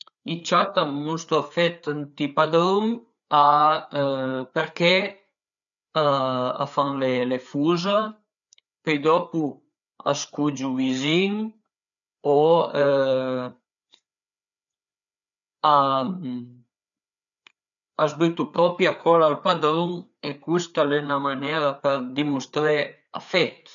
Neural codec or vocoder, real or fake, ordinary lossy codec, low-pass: codec, 16 kHz, 4 kbps, FreqCodec, larger model; fake; none; 7.2 kHz